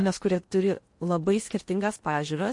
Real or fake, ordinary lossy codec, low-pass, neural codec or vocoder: fake; MP3, 48 kbps; 10.8 kHz; codec, 16 kHz in and 24 kHz out, 0.8 kbps, FocalCodec, streaming, 65536 codes